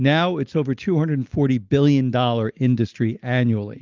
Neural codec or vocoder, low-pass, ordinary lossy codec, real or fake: none; 7.2 kHz; Opus, 32 kbps; real